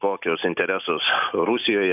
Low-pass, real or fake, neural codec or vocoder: 3.6 kHz; real; none